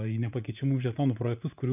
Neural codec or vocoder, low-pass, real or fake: vocoder, 44.1 kHz, 80 mel bands, Vocos; 3.6 kHz; fake